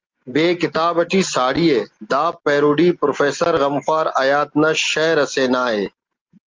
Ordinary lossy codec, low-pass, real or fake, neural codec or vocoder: Opus, 32 kbps; 7.2 kHz; real; none